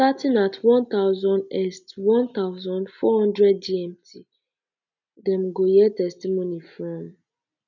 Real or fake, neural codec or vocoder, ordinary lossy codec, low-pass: real; none; none; 7.2 kHz